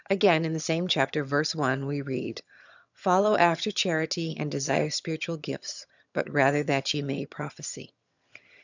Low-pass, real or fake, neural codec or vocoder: 7.2 kHz; fake; vocoder, 22.05 kHz, 80 mel bands, HiFi-GAN